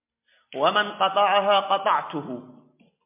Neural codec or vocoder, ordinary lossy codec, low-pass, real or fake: none; MP3, 32 kbps; 3.6 kHz; real